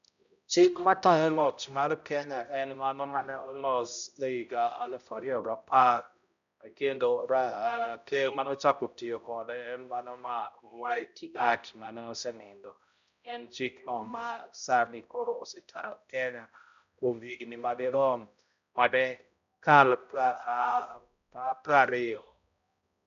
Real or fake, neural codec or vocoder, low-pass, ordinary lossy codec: fake; codec, 16 kHz, 0.5 kbps, X-Codec, HuBERT features, trained on balanced general audio; 7.2 kHz; none